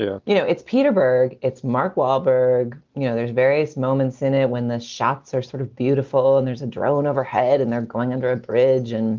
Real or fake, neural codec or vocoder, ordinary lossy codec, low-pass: real; none; Opus, 32 kbps; 7.2 kHz